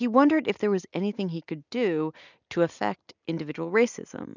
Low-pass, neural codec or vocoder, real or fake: 7.2 kHz; none; real